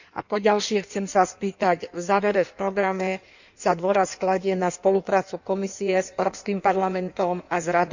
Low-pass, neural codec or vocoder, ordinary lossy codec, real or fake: 7.2 kHz; codec, 16 kHz in and 24 kHz out, 1.1 kbps, FireRedTTS-2 codec; none; fake